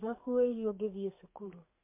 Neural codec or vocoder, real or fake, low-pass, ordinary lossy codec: codec, 32 kHz, 1.9 kbps, SNAC; fake; 3.6 kHz; MP3, 24 kbps